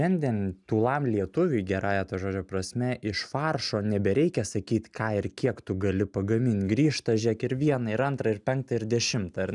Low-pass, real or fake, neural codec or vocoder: 10.8 kHz; real; none